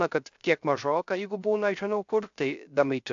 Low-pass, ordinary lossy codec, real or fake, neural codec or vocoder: 7.2 kHz; AAC, 64 kbps; fake; codec, 16 kHz, 0.3 kbps, FocalCodec